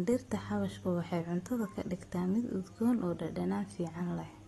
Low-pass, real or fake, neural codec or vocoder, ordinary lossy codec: 19.8 kHz; real; none; AAC, 32 kbps